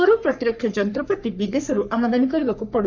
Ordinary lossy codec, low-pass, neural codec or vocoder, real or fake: none; 7.2 kHz; codec, 44.1 kHz, 3.4 kbps, Pupu-Codec; fake